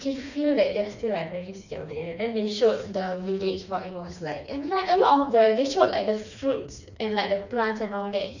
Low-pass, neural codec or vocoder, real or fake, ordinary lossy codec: 7.2 kHz; codec, 16 kHz, 2 kbps, FreqCodec, smaller model; fake; none